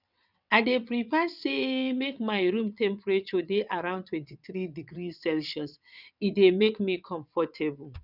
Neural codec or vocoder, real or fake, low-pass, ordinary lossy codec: vocoder, 22.05 kHz, 80 mel bands, WaveNeXt; fake; 5.4 kHz; none